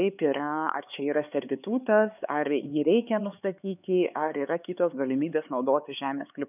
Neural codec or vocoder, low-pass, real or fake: codec, 16 kHz, 4 kbps, X-Codec, HuBERT features, trained on LibriSpeech; 3.6 kHz; fake